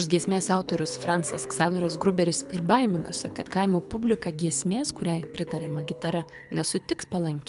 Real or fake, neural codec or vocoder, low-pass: fake; codec, 24 kHz, 3 kbps, HILCodec; 10.8 kHz